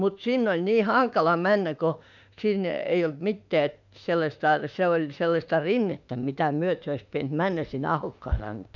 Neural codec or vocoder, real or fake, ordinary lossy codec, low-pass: autoencoder, 48 kHz, 32 numbers a frame, DAC-VAE, trained on Japanese speech; fake; none; 7.2 kHz